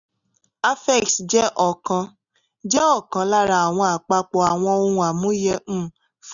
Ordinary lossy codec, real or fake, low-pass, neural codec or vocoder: none; real; 7.2 kHz; none